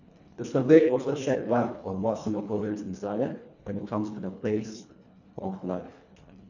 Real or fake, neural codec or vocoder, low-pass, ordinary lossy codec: fake; codec, 24 kHz, 1.5 kbps, HILCodec; 7.2 kHz; none